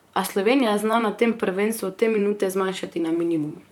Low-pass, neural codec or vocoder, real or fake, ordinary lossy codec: 19.8 kHz; vocoder, 44.1 kHz, 128 mel bands, Pupu-Vocoder; fake; none